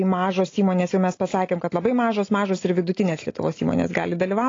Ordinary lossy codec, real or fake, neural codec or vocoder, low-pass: AAC, 32 kbps; real; none; 7.2 kHz